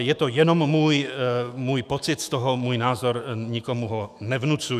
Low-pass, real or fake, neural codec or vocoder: 14.4 kHz; fake; autoencoder, 48 kHz, 128 numbers a frame, DAC-VAE, trained on Japanese speech